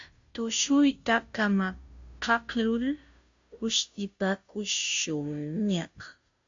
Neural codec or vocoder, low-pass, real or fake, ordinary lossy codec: codec, 16 kHz, 0.5 kbps, FunCodec, trained on Chinese and English, 25 frames a second; 7.2 kHz; fake; AAC, 64 kbps